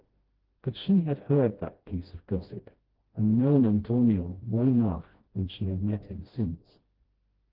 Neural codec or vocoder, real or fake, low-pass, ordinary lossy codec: codec, 16 kHz, 1 kbps, FreqCodec, smaller model; fake; 5.4 kHz; Opus, 16 kbps